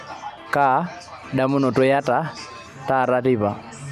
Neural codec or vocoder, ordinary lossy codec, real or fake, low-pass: none; none; real; 14.4 kHz